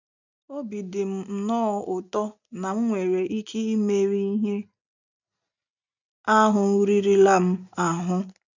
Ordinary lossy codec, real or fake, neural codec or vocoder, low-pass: none; real; none; 7.2 kHz